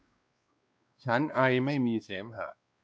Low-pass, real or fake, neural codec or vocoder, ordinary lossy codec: none; fake; codec, 16 kHz, 2 kbps, X-Codec, WavLM features, trained on Multilingual LibriSpeech; none